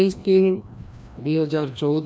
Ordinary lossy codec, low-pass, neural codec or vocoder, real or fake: none; none; codec, 16 kHz, 1 kbps, FreqCodec, larger model; fake